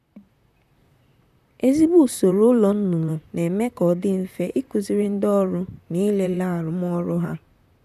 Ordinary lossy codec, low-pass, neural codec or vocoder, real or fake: none; 14.4 kHz; vocoder, 44.1 kHz, 128 mel bands, Pupu-Vocoder; fake